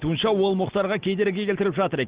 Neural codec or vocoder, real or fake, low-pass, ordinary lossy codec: none; real; 3.6 kHz; Opus, 16 kbps